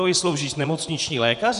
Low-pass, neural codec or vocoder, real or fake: 10.8 kHz; vocoder, 24 kHz, 100 mel bands, Vocos; fake